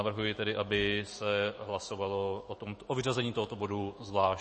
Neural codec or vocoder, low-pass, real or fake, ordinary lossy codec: autoencoder, 48 kHz, 128 numbers a frame, DAC-VAE, trained on Japanese speech; 10.8 kHz; fake; MP3, 32 kbps